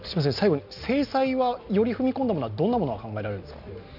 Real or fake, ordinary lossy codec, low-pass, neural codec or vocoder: real; none; 5.4 kHz; none